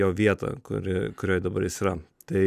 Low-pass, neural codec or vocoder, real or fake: 14.4 kHz; none; real